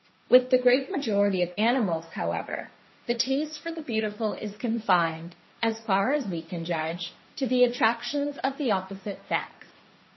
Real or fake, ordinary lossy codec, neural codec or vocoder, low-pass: fake; MP3, 24 kbps; codec, 16 kHz, 1.1 kbps, Voila-Tokenizer; 7.2 kHz